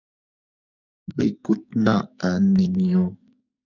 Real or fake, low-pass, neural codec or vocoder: fake; 7.2 kHz; codec, 44.1 kHz, 2.6 kbps, SNAC